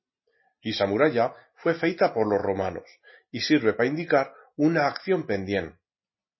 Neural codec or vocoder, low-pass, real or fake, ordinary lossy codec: none; 7.2 kHz; real; MP3, 24 kbps